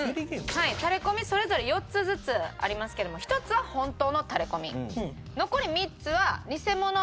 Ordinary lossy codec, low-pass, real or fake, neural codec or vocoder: none; none; real; none